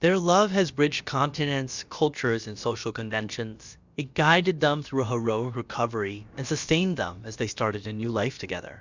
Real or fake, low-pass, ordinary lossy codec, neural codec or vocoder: fake; 7.2 kHz; Opus, 64 kbps; codec, 16 kHz, about 1 kbps, DyCAST, with the encoder's durations